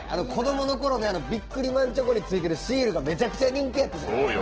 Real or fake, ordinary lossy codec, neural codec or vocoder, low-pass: fake; Opus, 16 kbps; autoencoder, 48 kHz, 128 numbers a frame, DAC-VAE, trained on Japanese speech; 7.2 kHz